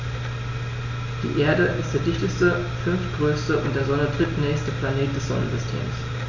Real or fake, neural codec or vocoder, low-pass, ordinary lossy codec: real; none; 7.2 kHz; none